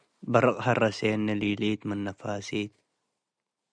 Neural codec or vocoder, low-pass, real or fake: none; 9.9 kHz; real